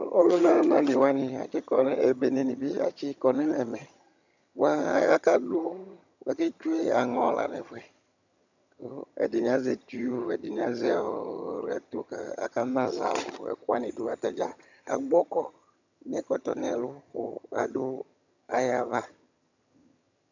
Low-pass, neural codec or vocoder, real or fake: 7.2 kHz; vocoder, 22.05 kHz, 80 mel bands, HiFi-GAN; fake